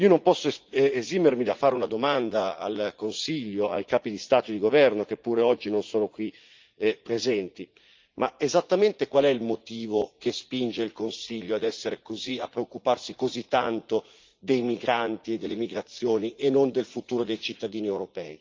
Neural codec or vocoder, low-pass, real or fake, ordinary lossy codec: vocoder, 44.1 kHz, 80 mel bands, Vocos; 7.2 kHz; fake; Opus, 24 kbps